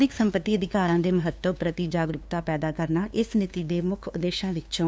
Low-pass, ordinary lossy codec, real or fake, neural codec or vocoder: none; none; fake; codec, 16 kHz, 2 kbps, FunCodec, trained on LibriTTS, 25 frames a second